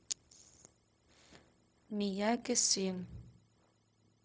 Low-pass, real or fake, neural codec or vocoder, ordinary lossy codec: none; fake; codec, 16 kHz, 0.4 kbps, LongCat-Audio-Codec; none